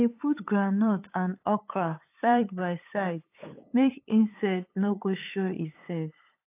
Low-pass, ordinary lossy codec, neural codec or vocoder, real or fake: 3.6 kHz; AAC, 24 kbps; codec, 16 kHz, 8 kbps, FunCodec, trained on LibriTTS, 25 frames a second; fake